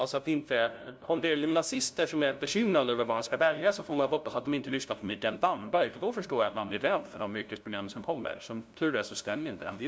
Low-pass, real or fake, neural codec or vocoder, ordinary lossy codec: none; fake; codec, 16 kHz, 0.5 kbps, FunCodec, trained on LibriTTS, 25 frames a second; none